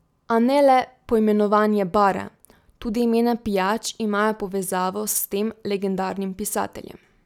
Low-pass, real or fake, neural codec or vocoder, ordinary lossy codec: 19.8 kHz; real; none; none